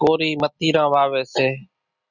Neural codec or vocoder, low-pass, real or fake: none; 7.2 kHz; real